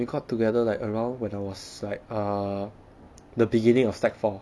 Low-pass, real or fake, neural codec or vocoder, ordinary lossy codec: none; real; none; none